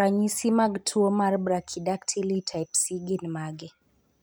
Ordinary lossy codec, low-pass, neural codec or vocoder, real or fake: none; none; none; real